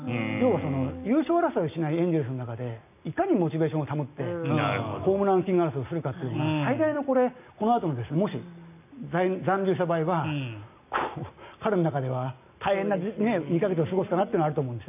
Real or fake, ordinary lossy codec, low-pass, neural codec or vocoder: real; none; 3.6 kHz; none